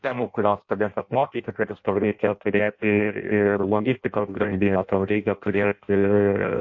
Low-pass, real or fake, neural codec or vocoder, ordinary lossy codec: 7.2 kHz; fake; codec, 16 kHz in and 24 kHz out, 0.6 kbps, FireRedTTS-2 codec; MP3, 48 kbps